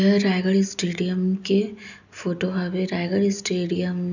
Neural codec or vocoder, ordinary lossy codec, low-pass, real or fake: none; none; 7.2 kHz; real